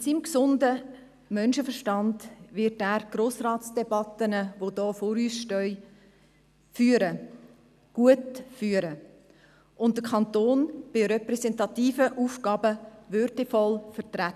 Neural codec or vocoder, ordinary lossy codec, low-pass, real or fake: none; none; 14.4 kHz; real